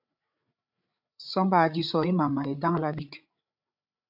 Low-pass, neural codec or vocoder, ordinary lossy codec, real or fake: 5.4 kHz; codec, 16 kHz, 8 kbps, FreqCodec, larger model; AAC, 48 kbps; fake